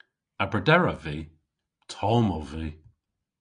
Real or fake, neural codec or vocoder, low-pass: real; none; 9.9 kHz